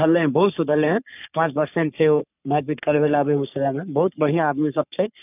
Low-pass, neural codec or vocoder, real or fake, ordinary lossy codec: 3.6 kHz; codec, 44.1 kHz, 3.4 kbps, Pupu-Codec; fake; none